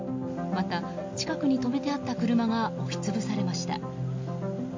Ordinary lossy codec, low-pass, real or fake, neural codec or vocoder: MP3, 48 kbps; 7.2 kHz; real; none